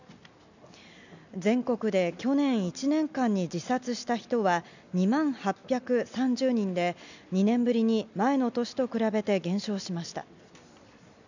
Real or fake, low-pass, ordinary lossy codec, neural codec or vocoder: real; 7.2 kHz; none; none